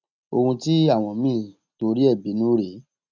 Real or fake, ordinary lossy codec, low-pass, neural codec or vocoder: real; none; 7.2 kHz; none